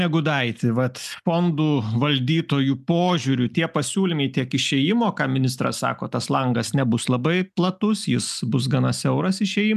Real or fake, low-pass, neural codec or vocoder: real; 14.4 kHz; none